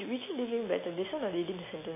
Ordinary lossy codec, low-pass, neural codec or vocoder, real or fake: MP3, 16 kbps; 3.6 kHz; none; real